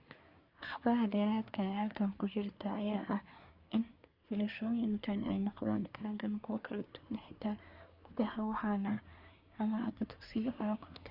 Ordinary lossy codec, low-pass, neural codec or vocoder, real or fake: Opus, 64 kbps; 5.4 kHz; codec, 24 kHz, 1 kbps, SNAC; fake